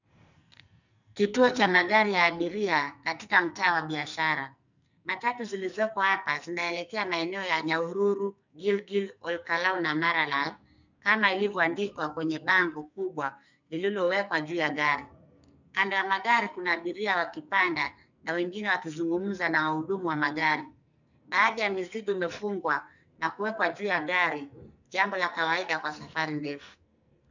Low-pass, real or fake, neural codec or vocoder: 7.2 kHz; fake; codec, 44.1 kHz, 2.6 kbps, SNAC